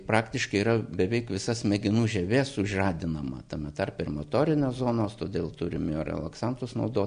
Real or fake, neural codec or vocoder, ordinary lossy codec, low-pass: real; none; AAC, 96 kbps; 9.9 kHz